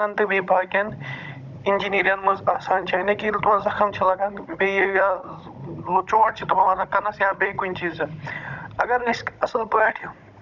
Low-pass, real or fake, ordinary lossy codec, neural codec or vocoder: 7.2 kHz; fake; none; codec, 16 kHz, 16 kbps, FunCodec, trained on Chinese and English, 50 frames a second